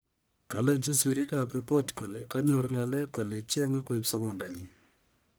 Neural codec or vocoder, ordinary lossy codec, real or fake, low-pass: codec, 44.1 kHz, 1.7 kbps, Pupu-Codec; none; fake; none